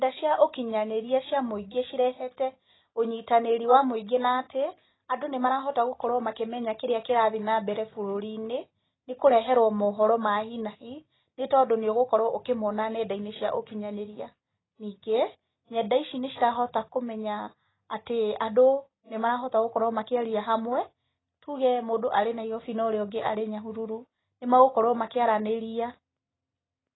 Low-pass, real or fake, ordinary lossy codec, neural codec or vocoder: 7.2 kHz; real; AAC, 16 kbps; none